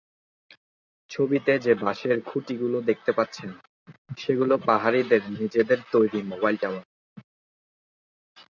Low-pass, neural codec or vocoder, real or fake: 7.2 kHz; none; real